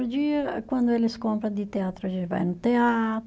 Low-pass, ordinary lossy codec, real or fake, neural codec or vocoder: none; none; real; none